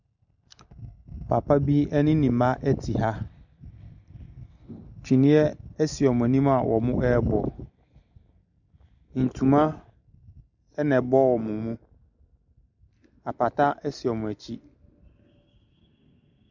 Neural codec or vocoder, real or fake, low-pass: none; real; 7.2 kHz